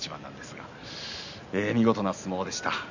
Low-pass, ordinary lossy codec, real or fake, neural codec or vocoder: 7.2 kHz; none; real; none